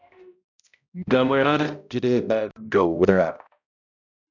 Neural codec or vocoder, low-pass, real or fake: codec, 16 kHz, 0.5 kbps, X-Codec, HuBERT features, trained on balanced general audio; 7.2 kHz; fake